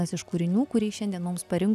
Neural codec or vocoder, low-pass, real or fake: none; 14.4 kHz; real